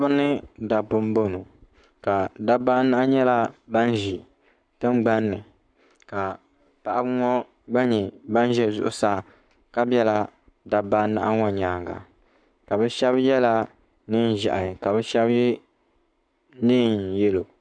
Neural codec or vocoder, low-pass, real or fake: codec, 44.1 kHz, 7.8 kbps, Pupu-Codec; 9.9 kHz; fake